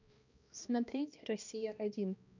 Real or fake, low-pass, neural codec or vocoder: fake; 7.2 kHz; codec, 16 kHz, 1 kbps, X-Codec, HuBERT features, trained on balanced general audio